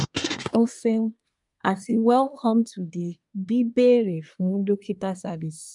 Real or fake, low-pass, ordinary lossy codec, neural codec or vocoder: fake; 10.8 kHz; none; codec, 24 kHz, 1 kbps, SNAC